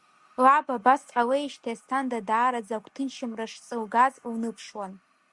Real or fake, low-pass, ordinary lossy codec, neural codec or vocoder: real; 10.8 kHz; Opus, 64 kbps; none